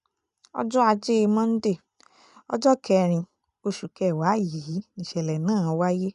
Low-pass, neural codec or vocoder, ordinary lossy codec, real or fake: 10.8 kHz; none; MP3, 96 kbps; real